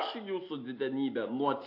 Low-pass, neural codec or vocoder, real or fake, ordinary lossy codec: 5.4 kHz; none; real; AAC, 32 kbps